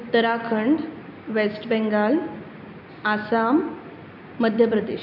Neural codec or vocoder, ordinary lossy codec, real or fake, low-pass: none; none; real; 5.4 kHz